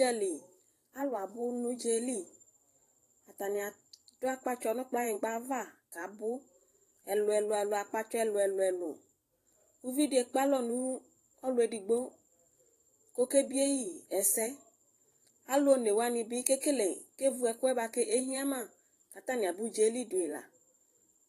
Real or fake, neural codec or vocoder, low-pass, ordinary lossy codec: fake; vocoder, 44.1 kHz, 128 mel bands every 512 samples, BigVGAN v2; 14.4 kHz; AAC, 48 kbps